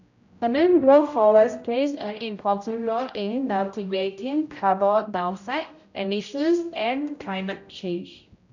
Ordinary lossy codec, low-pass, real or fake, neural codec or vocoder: none; 7.2 kHz; fake; codec, 16 kHz, 0.5 kbps, X-Codec, HuBERT features, trained on general audio